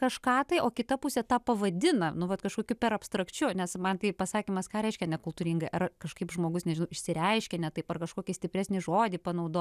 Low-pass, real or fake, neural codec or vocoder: 14.4 kHz; real; none